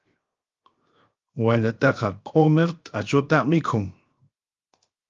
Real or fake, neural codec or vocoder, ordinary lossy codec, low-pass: fake; codec, 16 kHz, 0.7 kbps, FocalCodec; Opus, 32 kbps; 7.2 kHz